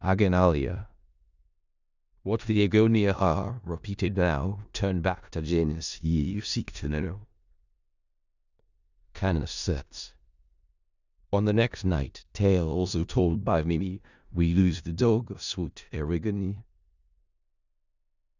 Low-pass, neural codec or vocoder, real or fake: 7.2 kHz; codec, 16 kHz in and 24 kHz out, 0.4 kbps, LongCat-Audio-Codec, four codebook decoder; fake